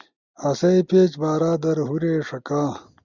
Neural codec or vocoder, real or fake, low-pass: none; real; 7.2 kHz